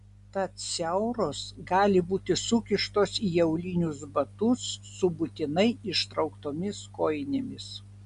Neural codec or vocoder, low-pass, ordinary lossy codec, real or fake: none; 10.8 kHz; MP3, 96 kbps; real